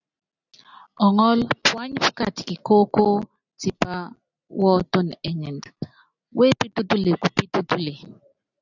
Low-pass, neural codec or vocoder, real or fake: 7.2 kHz; none; real